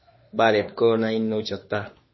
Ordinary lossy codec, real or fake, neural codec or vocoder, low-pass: MP3, 24 kbps; fake; autoencoder, 48 kHz, 32 numbers a frame, DAC-VAE, trained on Japanese speech; 7.2 kHz